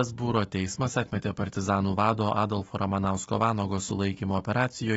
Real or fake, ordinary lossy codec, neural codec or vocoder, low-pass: fake; AAC, 24 kbps; autoencoder, 48 kHz, 128 numbers a frame, DAC-VAE, trained on Japanese speech; 19.8 kHz